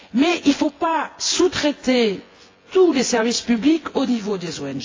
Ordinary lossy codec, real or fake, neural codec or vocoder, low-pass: AAC, 32 kbps; fake; vocoder, 24 kHz, 100 mel bands, Vocos; 7.2 kHz